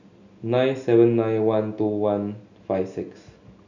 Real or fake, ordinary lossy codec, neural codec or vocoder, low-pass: real; none; none; 7.2 kHz